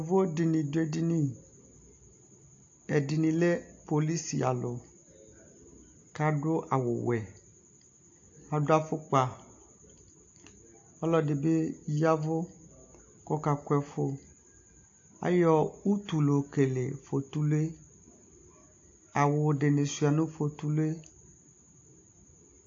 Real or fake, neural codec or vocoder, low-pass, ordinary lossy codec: real; none; 7.2 kHz; AAC, 48 kbps